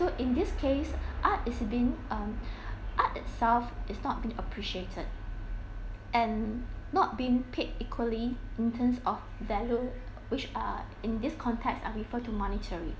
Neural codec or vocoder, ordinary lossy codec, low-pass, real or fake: none; none; none; real